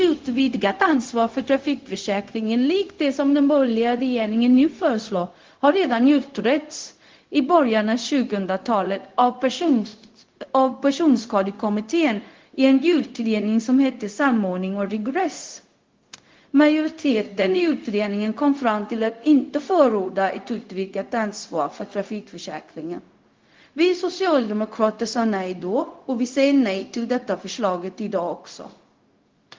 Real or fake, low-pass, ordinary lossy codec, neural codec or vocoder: fake; 7.2 kHz; Opus, 16 kbps; codec, 16 kHz, 0.4 kbps, LongCat-Audio-Codec